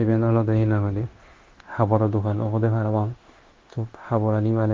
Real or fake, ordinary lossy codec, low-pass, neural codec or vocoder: fake; Opus, 16 kbps; 7.2 kHz; codec, 16 kHz, 0.9 kbps, LongCat-Audio-Codec